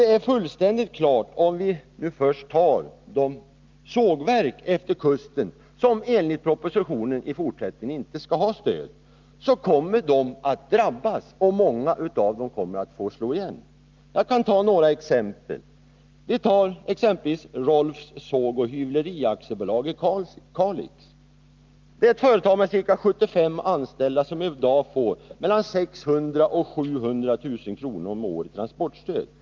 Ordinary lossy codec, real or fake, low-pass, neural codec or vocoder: Opus, 24 kbps; real; 7.2 kHz; none